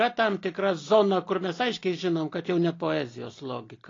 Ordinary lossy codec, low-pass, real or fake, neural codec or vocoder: AAC, 32 kbps; 7.2 kHz; real; none